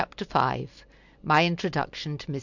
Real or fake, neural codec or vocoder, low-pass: real; none; 7.2 kHz